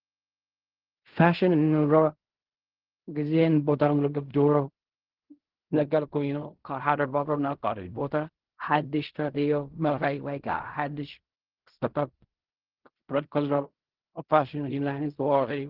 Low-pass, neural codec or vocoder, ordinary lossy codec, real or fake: 5.4 kHz; codec, 16 kHz in and 24 kHz out, 0.4 kbps, LongCat-Audio-Codec, fine tuned four codebook decoder; Opus, 16 kbps; fake